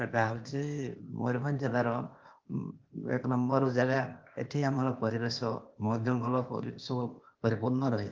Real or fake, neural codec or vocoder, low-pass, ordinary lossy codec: fake; codec, 16 kHz, 0.8 kbps, ZipCodec; 7.2 kHz; Opus, 32 kbps